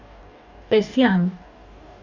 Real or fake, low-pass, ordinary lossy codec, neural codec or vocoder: fake; 7.2 kHz; none; codec, 44.1 kHz, 2.6 kbps, DAC